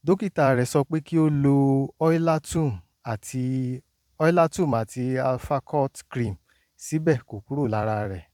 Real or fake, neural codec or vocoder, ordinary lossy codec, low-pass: fake; vocoder, 44.1 kHz, 128 mel bands every 256 samples, BigVGAN v2; none; 19.8 kHz